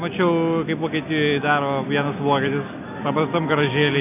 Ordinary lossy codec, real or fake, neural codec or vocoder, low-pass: AAC, 32 kbps; real; none; 3.6 kHz